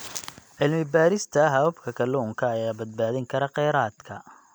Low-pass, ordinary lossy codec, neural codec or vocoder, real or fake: none; none; none; real